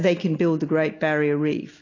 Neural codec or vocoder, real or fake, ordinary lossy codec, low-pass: none; real; AAC, 32 kbps; 7.2 kHz